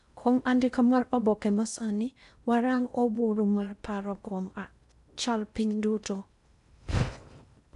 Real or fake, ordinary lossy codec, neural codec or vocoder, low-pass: fake; none; codec, 16 kHz in and 24 kHz out, 0.6 kbps, FocalCodec, streaming, 4096 codes; 10.8 kHz